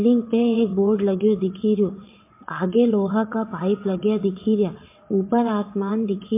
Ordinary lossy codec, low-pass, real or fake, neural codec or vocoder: MP3, 24 kbps; 3.6 kHz; fake; vocoder, 22.05 kHz, 80 mel bands, WaveNeXt